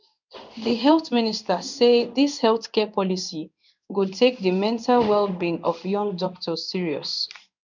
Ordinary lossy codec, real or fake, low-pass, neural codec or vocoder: none; fake; 7.2 kHz; codec, 16 kHz in and 24 kHz out, 1 kbps, XY-Tokenizer